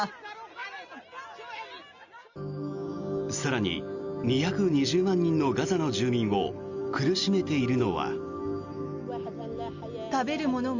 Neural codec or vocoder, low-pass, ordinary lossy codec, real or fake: none; 7.2 kHz; Opus, 64 kbps; real